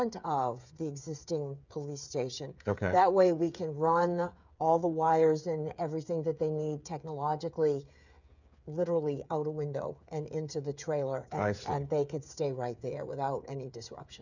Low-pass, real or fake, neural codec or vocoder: 7.2 kHz; fake; codec, 16 kHz, 8 kbps, FreqCodec, smaller model